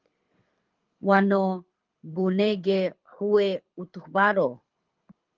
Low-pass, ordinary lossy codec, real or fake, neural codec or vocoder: 7.2 kHz; Opus, 32 kbps; fake; codec, 24 kHz, 6 kbps, HILCodec